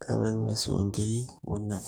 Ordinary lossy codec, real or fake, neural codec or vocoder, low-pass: none; fake; codec, 44.1 kHz, 2.6 kbps, DAC; none